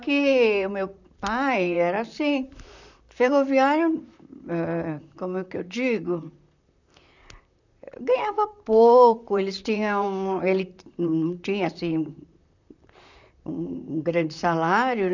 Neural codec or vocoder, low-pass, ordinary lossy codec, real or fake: vocoder, 44.1 kHz, 128 mel bands, Pupu-Vocoder; 7.2 kHz; none; fake